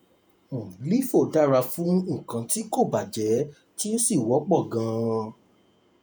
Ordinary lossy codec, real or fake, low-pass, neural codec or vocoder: none; fake; none; vocoder, 48 kHz, 128 mel bands, Vocos